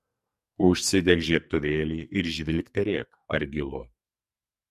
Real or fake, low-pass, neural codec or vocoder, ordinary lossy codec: fake; 14.4 kHz; codec, 44.1 kHz, 2.6 kbps, SNAC; MP3, 64 kbps